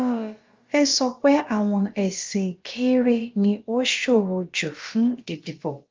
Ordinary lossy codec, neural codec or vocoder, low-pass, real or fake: Opus, 32 kbps; codec, 16 kHz, about 1 kbps, DyCAST, with the encoder's durations; 7.2 kHz; fake